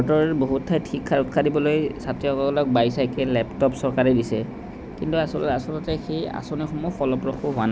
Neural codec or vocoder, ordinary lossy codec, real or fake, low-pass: none; none; real; none